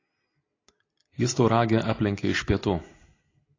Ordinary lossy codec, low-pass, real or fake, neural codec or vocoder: AAC, 32 kbps; 7.2 kHz; real; none